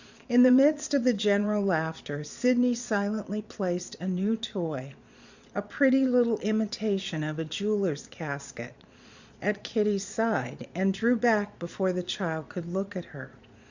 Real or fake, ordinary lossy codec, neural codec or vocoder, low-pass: fake; Opus, 64 kbps; vocoder, 22.05 kHz, 80 mel bands, Vocos; 7.2 kHz